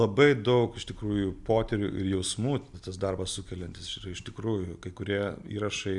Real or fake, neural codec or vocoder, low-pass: real; none; 10.8 kHz